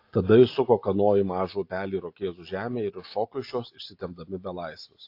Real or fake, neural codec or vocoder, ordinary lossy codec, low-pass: real; none; AAC, 32 kbps; 5.4 kHz